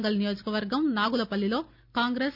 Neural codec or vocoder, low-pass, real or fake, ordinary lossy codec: none; 5.4 kHz; real; none